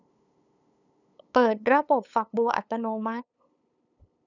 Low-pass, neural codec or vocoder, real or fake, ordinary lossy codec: 7.2 kHz; codec, 16 kHz, 8 kbps, FunCodec, trained on LibriTTS, 25 frames a second; fake; none